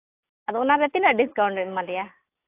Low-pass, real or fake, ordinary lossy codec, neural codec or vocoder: 3.6 kHz; real; AAC, 24 kbps; none